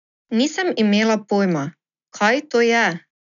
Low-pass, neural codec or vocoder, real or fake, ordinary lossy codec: 7.2 kHz; none; real; none